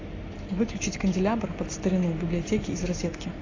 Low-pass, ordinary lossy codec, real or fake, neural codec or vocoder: 7.2 kHz; AAC, 32 kbps; real; none